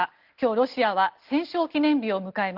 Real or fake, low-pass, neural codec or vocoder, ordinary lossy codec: real; 5.4 kHz; none; Opus, 16 kbps